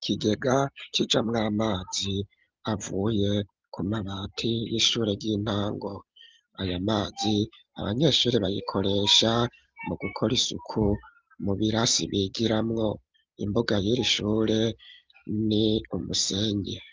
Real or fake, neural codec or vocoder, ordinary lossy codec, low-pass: real; none; Opus, 32 kbps; 7.2 kHz